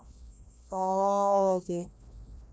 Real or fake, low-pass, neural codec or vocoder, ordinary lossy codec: fake; none; codec, 16 kHz, 1 kbps, FreqCodec, larger model; none